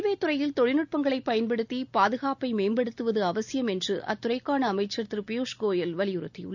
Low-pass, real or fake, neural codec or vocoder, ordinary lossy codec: 7.2 kHz; real; none; none